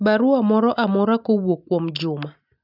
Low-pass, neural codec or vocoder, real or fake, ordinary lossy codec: 5.4 kHz; none; real; none